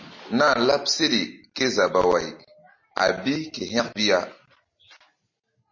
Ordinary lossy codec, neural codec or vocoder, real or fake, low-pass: MP3, 32 kbps; none; real; 7.2 kHz